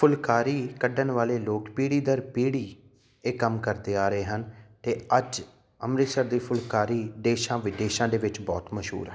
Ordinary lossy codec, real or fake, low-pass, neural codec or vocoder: none; real; none; none